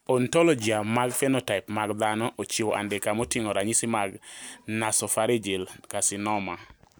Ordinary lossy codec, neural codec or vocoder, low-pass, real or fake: none; none; none; real